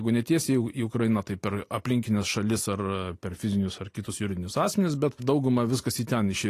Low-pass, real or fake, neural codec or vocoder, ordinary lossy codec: 14.4 kHz; real; none; AAC, 48 kbps